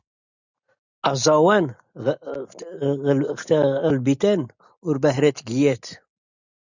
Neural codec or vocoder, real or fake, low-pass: none; real; 7.2 kHz